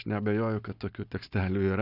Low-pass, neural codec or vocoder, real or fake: 5.4 kHz; none; real